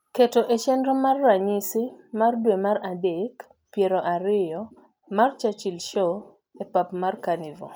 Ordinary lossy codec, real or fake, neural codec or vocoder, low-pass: none; real; none; none